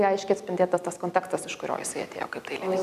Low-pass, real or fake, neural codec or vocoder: 14.4 kHz; real; none